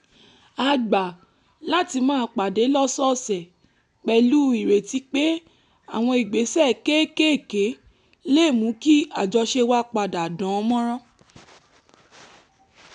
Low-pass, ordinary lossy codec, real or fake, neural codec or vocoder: 10.8 kHz; none; real; none